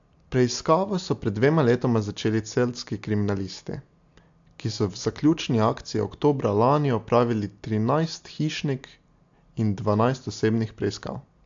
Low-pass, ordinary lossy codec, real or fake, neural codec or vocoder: 7.2 kHz; AAC, 64 kbps; real; none